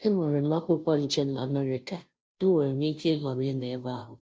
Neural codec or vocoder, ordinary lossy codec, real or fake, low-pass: codec, 16 kHz, 0.5 kbps, FunCodec, trained on Chinese and English, 25 frames a second; none; fake; none